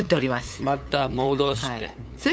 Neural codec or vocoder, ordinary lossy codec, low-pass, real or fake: codec, 16 kHz, 8 kbps, FunCodec, trained on LibriTTS, 25 frames a second; none; none; fake